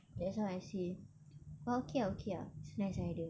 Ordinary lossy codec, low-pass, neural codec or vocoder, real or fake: none; none; none; real